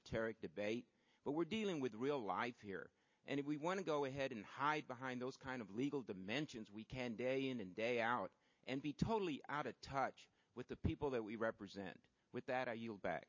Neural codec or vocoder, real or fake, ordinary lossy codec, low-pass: none; real; MP3, 32 kbps; 7.2 kHz